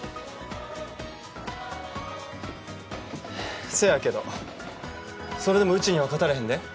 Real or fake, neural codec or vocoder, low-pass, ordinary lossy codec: real; none; none; none